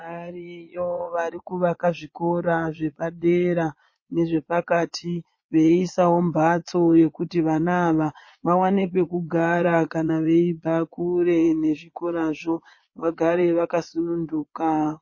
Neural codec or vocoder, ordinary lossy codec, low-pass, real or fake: vocoder, 22.05 kHz, 80 mel bands, Vocos; MP3, 32 kbps; 7.2 kHz; fake